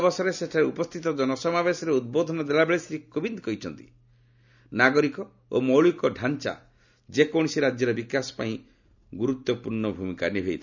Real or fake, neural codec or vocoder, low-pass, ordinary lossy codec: real; none; 7.2 kHz; none